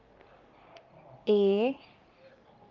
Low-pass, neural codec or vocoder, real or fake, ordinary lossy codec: 7.2 kHz; codec, 44.1 kHz, 3.4 kbps, Pupu-Codec; fake; Opus, 24 kbps